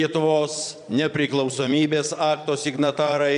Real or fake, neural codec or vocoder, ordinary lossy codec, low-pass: fake; vocoder, 22.05 kHz, 80 mel bands, Vocos; MP3, 96 kbps; 9.9 kHz